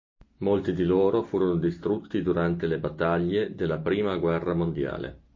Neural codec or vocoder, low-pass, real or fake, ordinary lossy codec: none; 7.2 kHz; real; MP3, 32 kbps